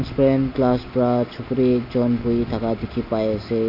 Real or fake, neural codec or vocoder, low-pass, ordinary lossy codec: real; none; 5.4 kHz; AAC, 48 kbps